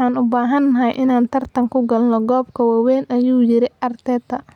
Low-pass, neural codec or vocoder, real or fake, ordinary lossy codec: 19.8 kHz; none; real; none